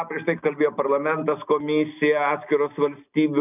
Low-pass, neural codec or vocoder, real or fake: 3.6 kHz; none; real